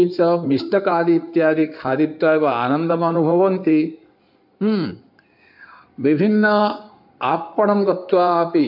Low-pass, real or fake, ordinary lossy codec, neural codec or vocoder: 5.4 kHz; fake; AAC, 48 kbps; codec, 16 kHz in and 24 kHz out, 2.2 kbps, FireRedTTS-2 codec